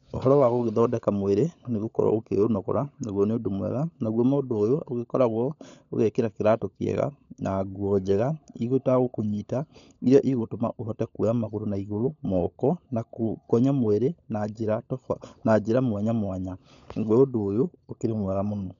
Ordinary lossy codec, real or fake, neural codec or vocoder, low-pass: none; fake; codec, 16 kHz, 16 kbps, FunCodec, trained on LibriTTS, 50 frames a second; 7.2 kHz